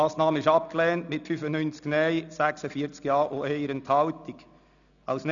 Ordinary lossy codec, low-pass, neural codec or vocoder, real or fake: none; 7.2 kHz; none; real